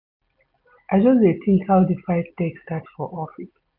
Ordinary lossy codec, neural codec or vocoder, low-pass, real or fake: none; none; 5.4 kHz; real